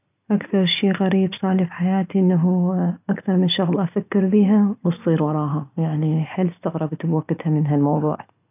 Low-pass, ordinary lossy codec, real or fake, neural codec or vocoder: 3.6 kHz; none; real; none